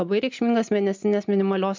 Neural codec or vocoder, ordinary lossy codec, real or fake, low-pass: none; MP3, 64 kbps; real; 7.2 kHz